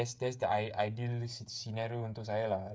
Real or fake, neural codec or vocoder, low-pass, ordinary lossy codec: fake; codec, 16 kHz, 16 kbps, FreqCodec, smaller model; none; none